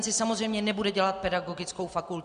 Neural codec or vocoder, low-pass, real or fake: none; 9.9 kHz; real